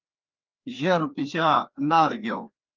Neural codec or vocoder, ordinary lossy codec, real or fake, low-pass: codec, 16 kHz, 2 kbps, FreqCodec, larger model; Opus, 32 kbps; fake; 7.2 kHz